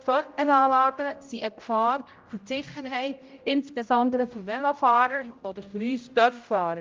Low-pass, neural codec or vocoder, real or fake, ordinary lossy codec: 7.2 kHz; codec, 16 kHz, 0.5 kbps, X-Codec, HuBERT features, trained on general audio; fake; Opus, 24 kbps